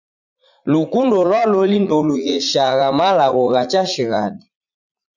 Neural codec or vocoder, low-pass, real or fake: vocoder, 44.1 kHz, 80 mel bands, Vocos; 7.2 kHz; fake